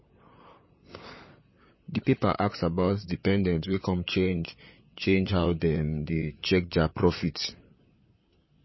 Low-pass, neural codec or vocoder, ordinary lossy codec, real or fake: 7.2 kHz; vocoder, 44.1 kHz, 128 mel bands, Pupu-Vocoder; MP3, 24 kbps; fake